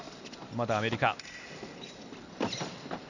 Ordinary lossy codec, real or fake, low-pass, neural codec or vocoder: none; real; 7.2 kHz; none